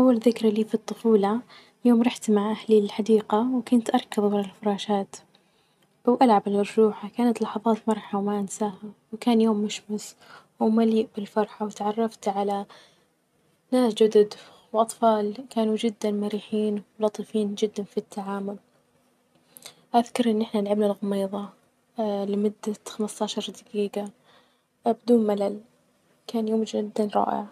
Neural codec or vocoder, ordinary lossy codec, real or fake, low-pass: none; none; real; 14.4 kHz